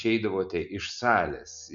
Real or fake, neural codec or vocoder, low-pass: real; none; 7.2 kHz